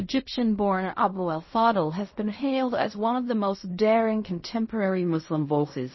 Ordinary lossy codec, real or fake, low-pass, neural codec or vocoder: MP3, 24 kbps; fake; 7.2 kHz; codec, 16 kHz in and 24 kHz out, 0.4 kbps, LongCat-Audio-Codec, fine tuned four codebook decoder